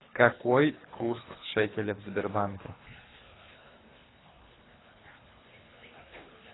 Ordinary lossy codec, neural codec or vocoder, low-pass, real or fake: AAC, 16 kbps; codec, 24 kHz, 3 kbps, HILCodec; 7.2 kHz; fake